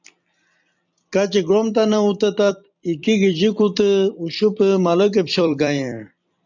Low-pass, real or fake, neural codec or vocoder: 7.2 kHz; fake; vocoder, 44.1 kHz, 128 mel bands every 256 samples, BigVGAN v2